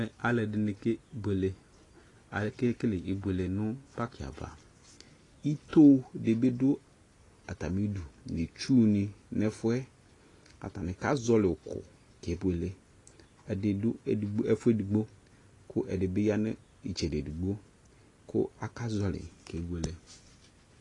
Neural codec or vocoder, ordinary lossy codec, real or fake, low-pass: none; AAC, 32 kbps; real; 10.8 kHz